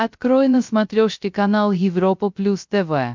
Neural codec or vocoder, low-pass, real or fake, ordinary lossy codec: codec, 16 kHz, 0.3 kbps, FocalCodec; 7.2 kHz; fake; MP3, 64 kbps